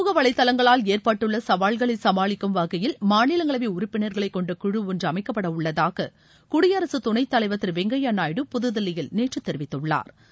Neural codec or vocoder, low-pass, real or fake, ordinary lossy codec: none; none; real; none